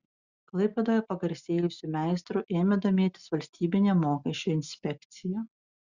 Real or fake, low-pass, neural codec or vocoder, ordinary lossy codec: real; 7.2 kHz; none; Opus, 64 kbps